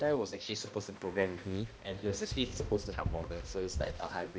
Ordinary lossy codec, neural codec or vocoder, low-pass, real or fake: none; codec, 16 kHz, 1 kbps, X-Codec, HuBERT features, trained on balanced general audio; none; fake